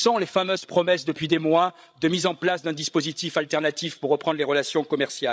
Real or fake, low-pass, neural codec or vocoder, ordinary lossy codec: fake; none; codec, 16 kHz, 16 kbps, FreqCodec, larger model; none